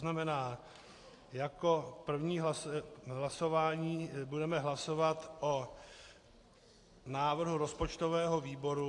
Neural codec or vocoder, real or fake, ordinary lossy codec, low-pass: none; real; AAC, 48 kbps; 10.8 kHz